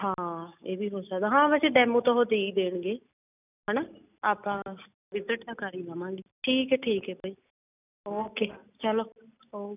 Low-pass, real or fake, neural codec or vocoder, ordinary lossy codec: 3.6 kHz; real; none; none